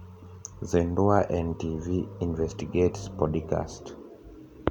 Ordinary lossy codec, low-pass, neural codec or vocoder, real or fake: none; 19.8 kHz; none; real